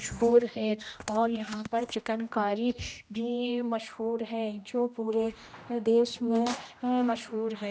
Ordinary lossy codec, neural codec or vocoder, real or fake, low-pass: none; codec, 16 kHz, 1 kbps, X-Codec, HuBERT features, trained on general audio; fake; none